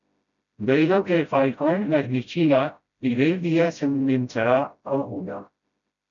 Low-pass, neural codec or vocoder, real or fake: 7.2 kHz; codec, 16 kHz, 0.5 kbps, FreqCodec, smaller model; fake